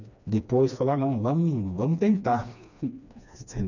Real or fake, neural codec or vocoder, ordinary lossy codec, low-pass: fake; codec, 16 kHz, 2 kbps, FreqCodec, smaller model; none; 7.2 kHz